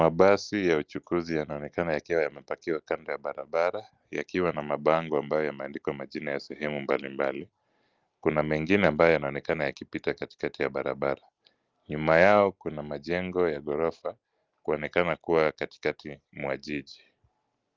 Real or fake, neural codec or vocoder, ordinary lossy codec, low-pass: real; none; Opus, 24 kbps; 7.2 kHz